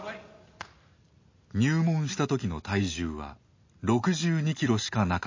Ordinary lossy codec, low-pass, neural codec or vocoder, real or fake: MP3, 32 kbps; 7.2 kHz; none; real